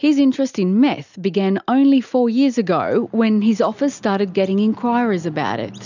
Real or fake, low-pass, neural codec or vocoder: real; 7.2 kHz; none